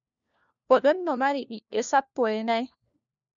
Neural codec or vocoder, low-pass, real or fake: codec, 16 kHz, 1 kbps, FunCodec, trained on LibriTTS, 50 frames a second; 7.2 kHz; fake